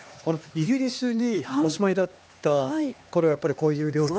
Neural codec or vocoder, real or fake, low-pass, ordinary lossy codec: codec, 16 kHz, 2 kbps, X-Codec, HuBERT features, trained on LibriSpeech; fake; none; none